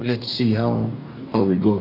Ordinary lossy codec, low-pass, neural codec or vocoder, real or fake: AAC, 32 kbps; 5.4 kHz; codec, 44.1 kHz, 2.6 kbps, DAC; fake